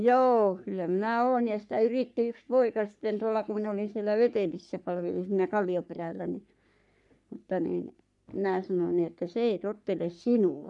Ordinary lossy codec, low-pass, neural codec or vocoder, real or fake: none; 10.8 kHz; codec, 44.1 kHz, 3.4 kbps, Pupu-Codec; fake